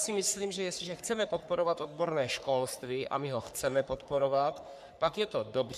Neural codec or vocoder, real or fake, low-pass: codec, 44.1 kHz, 3.4 kbps, Pupu-Codec; fake; 14.4 kHz